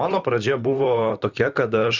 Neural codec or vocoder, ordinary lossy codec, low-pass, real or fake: vocoder, 44.1 kHz, 128 mel bands, Pupu-Vocoder; Opus, 64 kbps; 7.2 kHz; fake